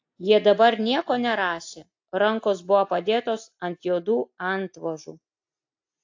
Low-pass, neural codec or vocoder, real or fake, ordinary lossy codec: 7.2 kHz; none; real; AAC, 48 kbps